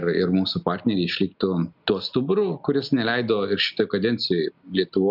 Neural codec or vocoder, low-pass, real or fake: none; 5.4 kHz; real